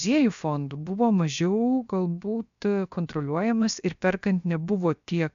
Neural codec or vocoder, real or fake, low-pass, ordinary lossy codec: codec, 16 kHz, 0.7 kbps, FocalCodec; fake; 7.2 kHz; AAC, 96 kbps